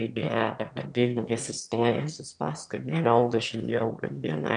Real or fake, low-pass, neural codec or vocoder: fake; 9.9 kHz; autoencoder, 22.05 kHz, a latent of 192 numbers a frame, VITS, trained on one speaker